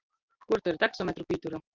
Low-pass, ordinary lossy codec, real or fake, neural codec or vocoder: 7.2 kHz; Opus, 32 kbps; real; none